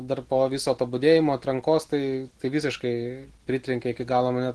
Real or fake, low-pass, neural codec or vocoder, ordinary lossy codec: real; 10.8 kHz; none; Opus, 16 kbps